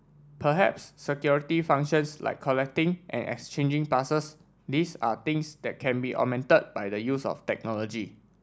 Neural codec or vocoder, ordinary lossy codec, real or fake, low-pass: none; none; real; none